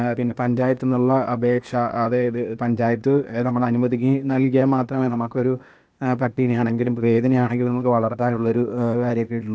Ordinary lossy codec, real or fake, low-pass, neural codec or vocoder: none; fake; none; codec, 16 kHz, 0.8 kbps, ZipCodec